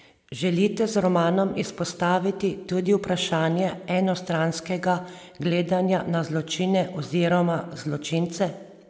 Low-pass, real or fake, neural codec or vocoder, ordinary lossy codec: none; real; none; none